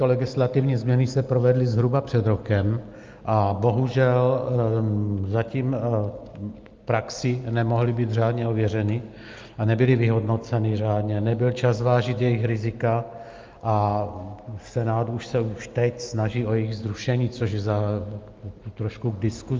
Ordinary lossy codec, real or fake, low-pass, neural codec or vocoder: Opus, 24 kbps; real; 7.2 kHz; none